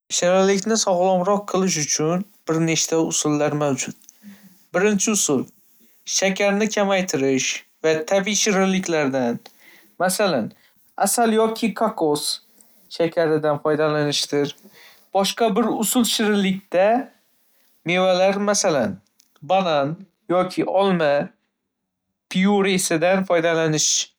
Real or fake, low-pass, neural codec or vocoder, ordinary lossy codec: real; none; none; none